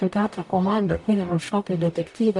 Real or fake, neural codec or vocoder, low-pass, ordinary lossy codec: fake; codec, 44.1 kHz, 0.9 kbps, DAC; 10.8 kHz; MP3, 64 kbps